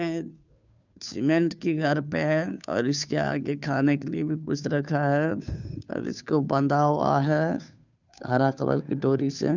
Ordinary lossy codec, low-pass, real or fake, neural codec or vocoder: none; 7.2 kHz; fake; codec, 16 kHz, 2 kbps, FunCodec, trained on Chinese and English, 25 frames a second